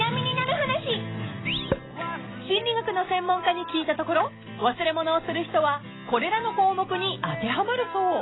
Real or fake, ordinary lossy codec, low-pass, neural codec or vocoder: real; AAC, 16 kbps; 7.2 kHz; none